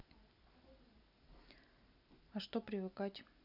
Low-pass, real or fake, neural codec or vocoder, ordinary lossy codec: 5.4 kHz; real; none; none